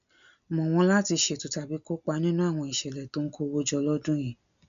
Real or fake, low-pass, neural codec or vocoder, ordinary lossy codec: real; 7.2 kHz; none; none